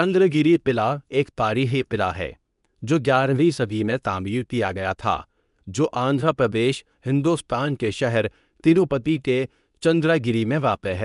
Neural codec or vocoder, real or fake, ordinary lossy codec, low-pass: codec, 24 kHz, 0.9 kbps, WavTokenizer, medium speech release version 2; fake; none; 10.8 kHz